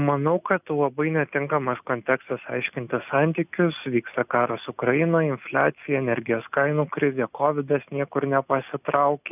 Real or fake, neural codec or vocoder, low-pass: real; none; 3.6 kHz